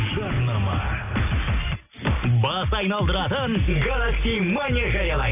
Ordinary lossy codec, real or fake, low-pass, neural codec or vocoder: none; real; 3.6 kHz; none